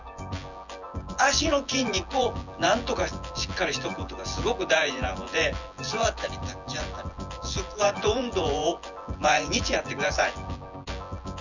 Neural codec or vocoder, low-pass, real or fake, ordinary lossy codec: vocoder, 24 kHz, 100 mel bands, Vocos; 7.2 kHz; fake; none